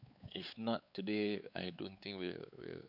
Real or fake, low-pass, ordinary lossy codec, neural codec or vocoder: fake; 5.4 kHz; none; codec, 16 kHz, 4 kbps, X-Codec, WavLM features, trained on Multilingual LibriSpeech